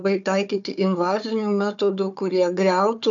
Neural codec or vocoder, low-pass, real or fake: codec, 16 kHz, 4 kbps, FunCodec, trained on Chinese and English, 50 frames a second; 7.2 kHz; fake